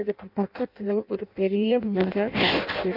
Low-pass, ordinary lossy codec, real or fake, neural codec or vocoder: 5.4 kHz; none; fake; codec, 16 kHz in and 24 kHz out, 0.6 kbps, FireRedTTS-2 codec